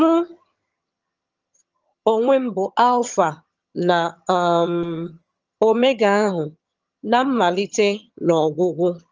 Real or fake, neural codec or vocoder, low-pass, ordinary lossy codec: fake; vocoder, 22.05 kHz, 80 mel bands, HiFi-GAN; 7.2 kHz; Opus, 24 kbps